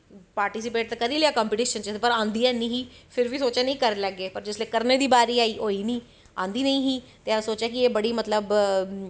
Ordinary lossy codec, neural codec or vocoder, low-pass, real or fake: none; none; none; real